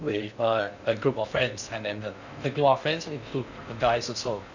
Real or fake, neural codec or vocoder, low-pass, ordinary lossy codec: fake; codec, 16 kHz in and 24 kHz out, 0.6 kbps, FocalCodec, streaming, 2048 codes; 7.2 kHz; none